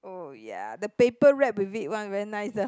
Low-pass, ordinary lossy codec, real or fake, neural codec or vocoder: none; none; real; none